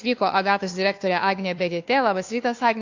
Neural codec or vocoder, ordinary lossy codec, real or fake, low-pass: codec, 16 kHz, 2 kbps, FunCodec, trained on Chinese and English, 25 frames a second; AAC, 48 kbps; fake; 7.2 kHz